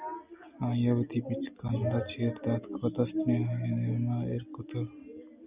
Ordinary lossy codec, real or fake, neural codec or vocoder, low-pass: Opus, 64 kbps; real; none; 3.6 kHz